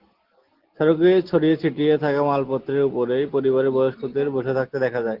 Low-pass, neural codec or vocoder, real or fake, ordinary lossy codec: 5.4 kHz; none; real; Opus, 16 kbps